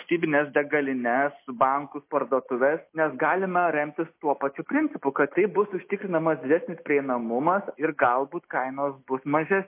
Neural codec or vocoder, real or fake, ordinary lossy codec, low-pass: none; real; MP3, 24 kbps; 3.6 kHz